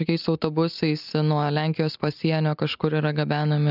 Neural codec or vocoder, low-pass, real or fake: none; 5.4 kHz; real